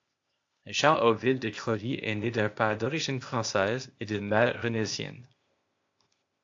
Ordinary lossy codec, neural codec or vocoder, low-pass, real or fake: AAC, 48 kbps; codec, 16 kHz, 0.8 kbps, ZipCodec; 7.2 kHz; fake